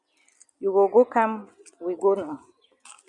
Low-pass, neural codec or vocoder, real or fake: 10.8 kHz; none; real